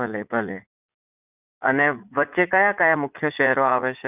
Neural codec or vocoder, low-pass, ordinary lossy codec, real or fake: vocoder, 44.1 kHz, 80 mel bands, Vocos; 3.6 kHz; none; fake